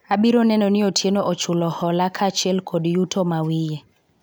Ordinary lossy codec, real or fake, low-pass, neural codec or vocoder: none; real; none; none